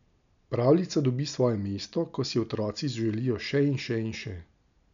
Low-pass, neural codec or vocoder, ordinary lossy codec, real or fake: 7.2 kHz; none; none; real